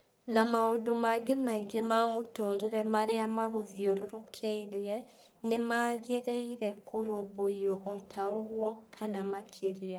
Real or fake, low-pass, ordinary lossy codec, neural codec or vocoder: fake; none; none; codec, 44.1 kHz, 1.7 kbps, Pupu-Codec